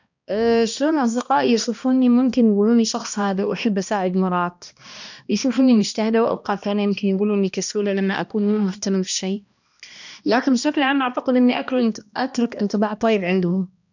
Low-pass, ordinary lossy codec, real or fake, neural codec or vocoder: 7.2 kHz; none; fake; codec, 16 kHz, 1 kbps, X-Codec, HuBERT features, trained on balanced general audio